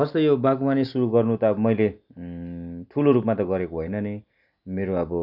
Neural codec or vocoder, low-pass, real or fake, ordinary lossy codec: none; 5.4 kHz; real; none